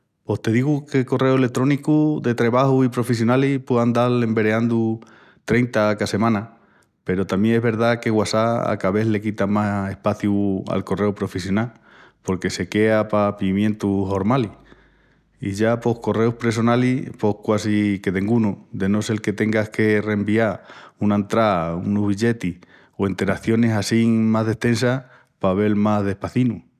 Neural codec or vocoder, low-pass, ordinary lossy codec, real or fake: none; 14.4 kHz; none; real